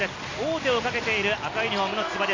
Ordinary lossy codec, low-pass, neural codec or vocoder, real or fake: AAC, 32 kbps; 7.2 kHz; none; real